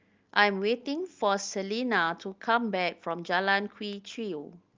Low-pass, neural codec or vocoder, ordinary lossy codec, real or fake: 7.2 kHz; none; Opus, 32 kbps; real